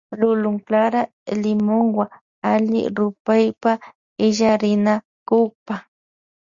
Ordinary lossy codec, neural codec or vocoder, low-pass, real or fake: Opus, 64 kbps; none; 7.2 kHz; real